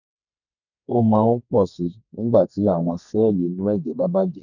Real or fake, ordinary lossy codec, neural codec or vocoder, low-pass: fake; none; codec, 32 kHz, 1.9 kbps, SNAC; 7.2 kHz